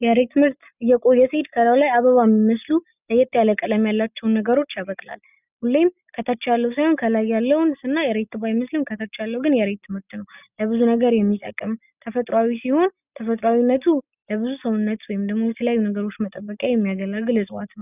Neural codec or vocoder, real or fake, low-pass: none; real; 3.6 kHz